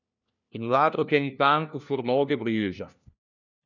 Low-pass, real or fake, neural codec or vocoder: 7.2 kHz; fake; codec, 16 kHz, 1 kbps, FunCodec, trained on LibriTTS, 50 frames a second